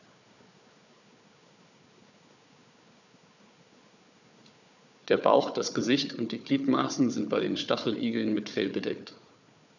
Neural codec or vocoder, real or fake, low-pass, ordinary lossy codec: codec, 16 kHz, 4 kbps, FunCodec, trained on Chinese and English, 50 frames a second; fake; 7.2 kHz; none